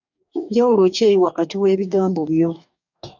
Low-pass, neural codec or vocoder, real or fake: 7.2 kHz; codec, 44.1 kHz, 2.6 kbps, DAC; fake